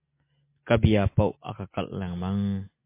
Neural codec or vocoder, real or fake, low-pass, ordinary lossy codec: none; real; 3.6 kHz; MP3, 24 kbps